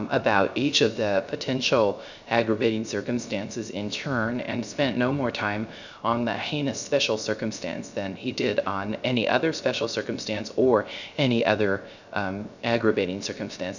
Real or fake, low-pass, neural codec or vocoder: fake; 7.2 kHz; codec, 16 kHz, 0.3 kbps, FocalCodec